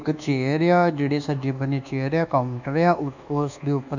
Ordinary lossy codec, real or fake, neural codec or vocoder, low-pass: none; fake; codec, 24 kHz, 1.2 kbps, DualCodec; 7.2 kHz